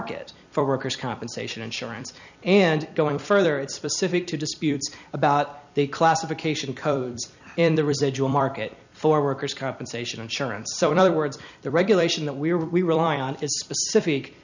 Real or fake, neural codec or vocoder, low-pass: fake; vocoder, 44.1 kHz, 128 mel bands every 256 samples, BigVGAN v2; 7.2 kHz